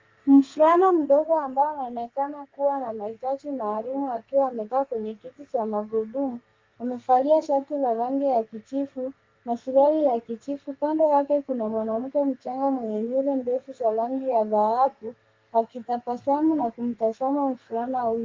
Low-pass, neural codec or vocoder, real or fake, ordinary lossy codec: 7.2 kHz; codec, 32 kHz, 1.9 kbps, SNAC; fake; Opus, 32 kbps